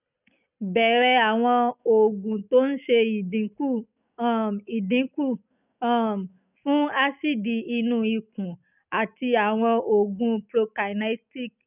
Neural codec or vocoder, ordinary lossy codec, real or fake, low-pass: none; none; real; 3.6 kHz